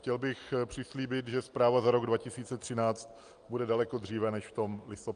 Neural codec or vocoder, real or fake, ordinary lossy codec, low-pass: none; real; Opus, 32 kbps; 9.9 kHz